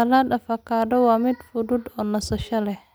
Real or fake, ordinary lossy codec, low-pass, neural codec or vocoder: real; none; none; none